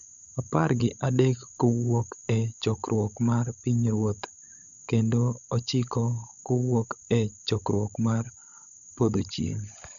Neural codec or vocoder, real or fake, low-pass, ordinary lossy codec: codec, 16 kHz, 16 kbps, FunCodec, trained on LibriTTS, 50 frames a second; fake; 7.2 kHz; none